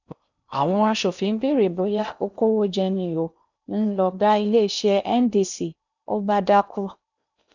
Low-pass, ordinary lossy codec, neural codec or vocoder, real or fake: 7.2 kHz; none; codec, 16 kHz in and 24 kHz out, 0.8 kbps, FocalCodec, streaming, 65536 codes; fake